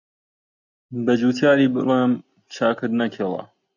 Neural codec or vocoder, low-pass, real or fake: none; 7.2 kHz; real